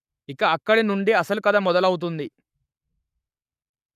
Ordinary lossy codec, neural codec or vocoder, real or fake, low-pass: none; codec, 44.1 kHz, 7.8 kbps, Pupu-Codec; fake; 14.4 kHz